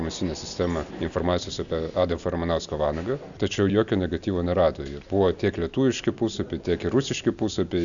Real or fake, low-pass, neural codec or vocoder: real; 7.2 kHz; none